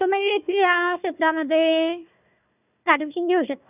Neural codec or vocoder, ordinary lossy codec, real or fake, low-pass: codec, 16 kHz, 1 kbps, FunCodec, trained on Chinese and English, 50 frames a second; none; fake; 3.6 kHz